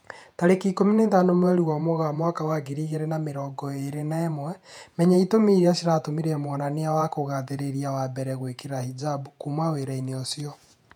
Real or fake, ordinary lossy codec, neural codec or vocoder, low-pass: fake; none; vocoder, 48 kHz, 128 mel bands, Vocos; 19.8 kHz